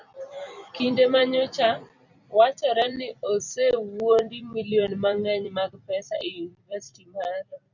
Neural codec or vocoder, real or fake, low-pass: vocoder, 44.1 kHz, 128 mel bands every 256 samples, BigVGAN v2; fake; 7.2 kHz